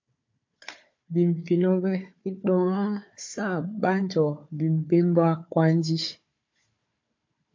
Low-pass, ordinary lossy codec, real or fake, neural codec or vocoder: 7.2 kHz; MP3, 48 kbps; fake; codec, 16 kHz, 4 kbps, FunCodec, trained on Chinese and English, 50 frames a second